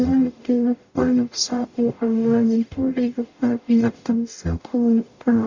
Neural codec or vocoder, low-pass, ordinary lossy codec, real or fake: codec, 44.1 kHz, 0.9 kbps, DAC; 7.2 kHz; Opus, 64 kbps; fake